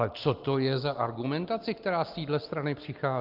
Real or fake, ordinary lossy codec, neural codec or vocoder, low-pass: real; Opus, 32 kbps; none; 5.4 kHz